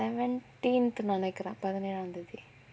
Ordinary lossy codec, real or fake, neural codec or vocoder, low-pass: none; real; none; none